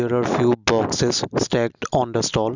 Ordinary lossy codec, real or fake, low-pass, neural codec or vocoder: none; real; 7.2 kHz; none